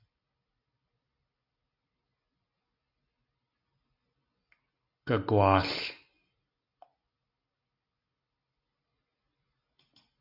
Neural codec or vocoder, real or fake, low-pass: none; real; 5.4 kHz